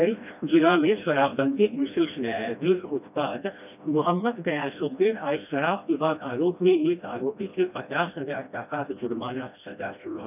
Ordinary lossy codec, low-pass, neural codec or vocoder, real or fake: none; 3.6 kHz; codec, 16 kHz, 1 kbps, FreqCodec, smaller model; fake